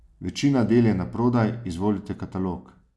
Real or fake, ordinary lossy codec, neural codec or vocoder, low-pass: real; none; none; none